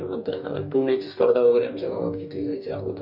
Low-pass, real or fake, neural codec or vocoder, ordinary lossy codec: 5.4 kHz; fake; codec, 44.1 kHz, 2.6 kbps, DAC; none